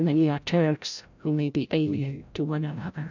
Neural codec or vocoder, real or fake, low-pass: codec, 16 kHz, 0.5 kbps, FreqCodec, larger model; fake; 7.2 kHz